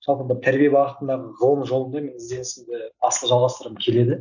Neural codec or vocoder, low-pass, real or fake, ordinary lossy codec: none; 7.2 kHz; real; none